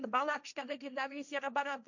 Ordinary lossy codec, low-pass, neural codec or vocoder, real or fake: none; 7.2 kHz; codec, 16 kHz, 1.1 kbps, Voila-Tokenizer; fake